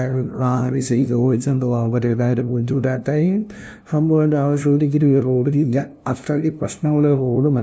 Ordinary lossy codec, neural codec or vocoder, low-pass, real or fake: none; codec, 16 kHz, 0.5 kbps, FunCodec, trained on LibriTTS, 25 frames a second; none; fake